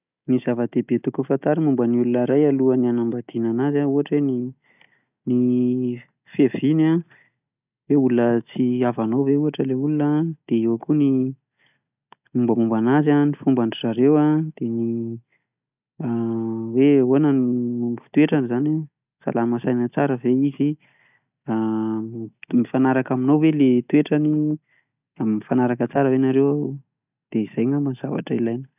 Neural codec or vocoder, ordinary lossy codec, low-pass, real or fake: none; none; 3.6 kHz; real